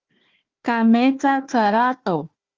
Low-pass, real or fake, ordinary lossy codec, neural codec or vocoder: 7.2 kHz; fake; Opus, 32 kbps; codec, 16 kHz, 1 kbps, FunCodec, trained on Chinese and English, 50 frames a second